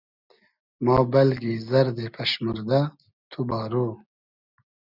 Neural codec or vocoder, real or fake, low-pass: none; real; 5.4 kHz